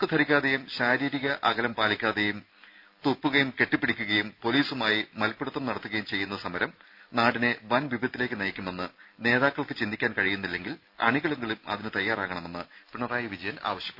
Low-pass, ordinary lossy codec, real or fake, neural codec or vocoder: 5.4 kHz; none; real; none